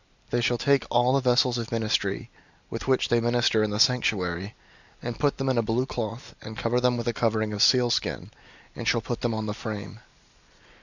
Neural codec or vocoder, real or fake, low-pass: none; real; 7.2 kHz